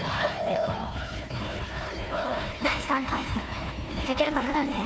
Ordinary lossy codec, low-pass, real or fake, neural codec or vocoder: none; none; fake; codec, 16 kHz, 1 kbps, FunCodec, trained on Chinese and English, 50 frames a second